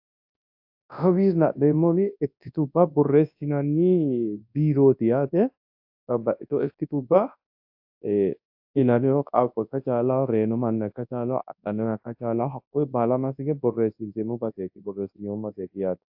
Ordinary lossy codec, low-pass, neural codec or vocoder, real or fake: AAC, 48 kbps; 5.4 kHz; codec, 24 kHz, 0.9 kbps, WavTokenizer, large speech release; fake